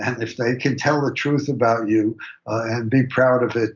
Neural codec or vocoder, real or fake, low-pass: none; real; 7.2 kHz